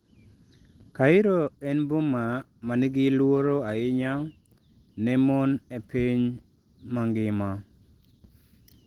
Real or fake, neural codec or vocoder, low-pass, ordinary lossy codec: real; none; 19.8 kHz; Opus, 16 kbps